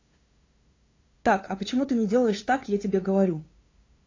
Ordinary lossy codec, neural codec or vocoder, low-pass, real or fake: AAC, 32 kbps; codec, 16 kHz, 2 kbps, FunCodec, trained on LibriTTS, 25 frames a second; 7.2 kHz; fake